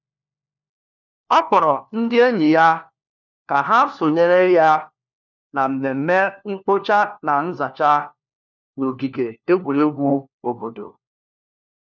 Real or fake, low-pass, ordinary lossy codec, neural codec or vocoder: fake; 7.2 kHz; none; codec, 16 kHz, 1 kbps, FunCodec, trained on LibriTTS, 50 frames a second